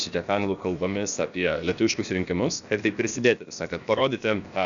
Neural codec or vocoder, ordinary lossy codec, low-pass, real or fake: codec, 16 kHz, about 1 kbps, DyCAST, with the encoder's durations; MP3, 96 kbps; 7.2 kHz; fake